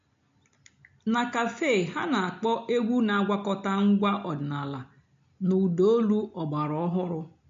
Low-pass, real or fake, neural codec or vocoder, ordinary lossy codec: 7.2 kHz; real; none; MP3, 48 kbps